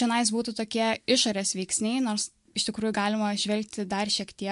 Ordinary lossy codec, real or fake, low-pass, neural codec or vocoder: MP3, 64 kbps; real; 10.8 kHz; none